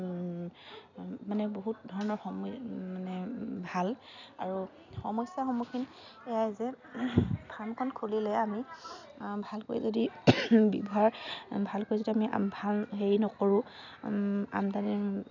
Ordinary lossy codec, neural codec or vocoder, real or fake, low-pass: none; none; real; 7.2 kHz